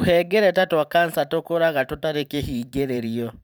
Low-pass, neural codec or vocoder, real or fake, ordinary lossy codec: none; vocoder, 44.1 kHz, 128 mel bands every 512 samples, BigVGAN v2; fake; none